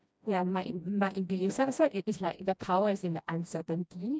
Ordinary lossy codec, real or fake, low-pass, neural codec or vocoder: none; fake; none; codec, 16 kHz, 1 kbps, FreqCodec, smaller model